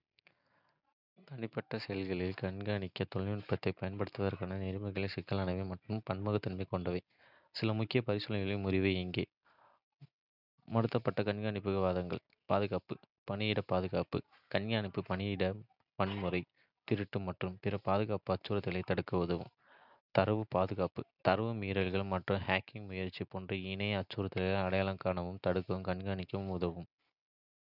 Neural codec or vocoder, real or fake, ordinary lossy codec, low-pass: none; real; none; 5.4 kHz